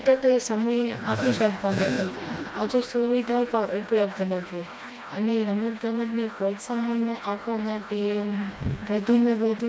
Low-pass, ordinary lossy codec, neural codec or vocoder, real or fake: none; none; codec, 16 kHz, 1 kbps, FreqCodec, smaller model; fake